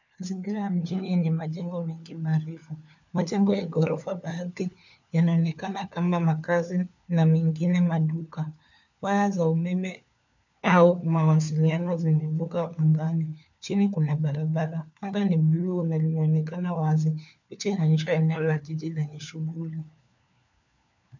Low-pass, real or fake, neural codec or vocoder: 7.2 kHz; fake; codec, 16 kHz, 4 kbps, FunCodec, trained on LibriTTS, 50 frames a second